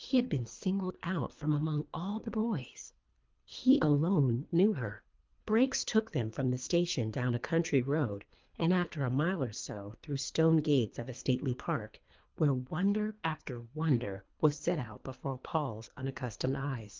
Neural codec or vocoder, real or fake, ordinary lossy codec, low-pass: codec, 24 kHz, 3 kbps, HILCodec; fake; Opus, 24 kbps; 7.2 kHz